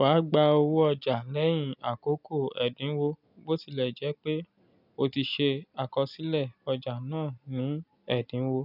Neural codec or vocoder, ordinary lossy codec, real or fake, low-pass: none; none; real; 5.4 kHz